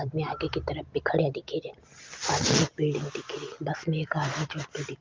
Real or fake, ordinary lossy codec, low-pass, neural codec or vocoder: real; Opus, 32 kbps; 7.2 kHz; none